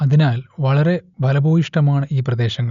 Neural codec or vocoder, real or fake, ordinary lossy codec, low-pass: none; real; none; 7.2 kHz